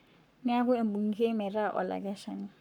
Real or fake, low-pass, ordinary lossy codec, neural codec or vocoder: fake; 19.8 kHz; none; codec, 44.1 kHz, 7.8 kbps, Pupu-Codec